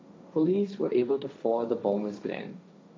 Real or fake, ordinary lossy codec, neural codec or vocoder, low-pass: fake; none; codec, 16 kHz, 1.1 kbps, Voila-Tokenizer; none